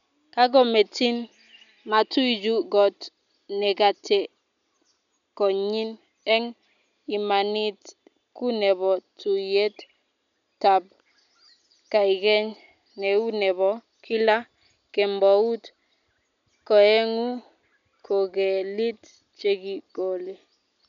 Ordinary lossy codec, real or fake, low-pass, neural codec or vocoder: none; real; 7.2 kHz; none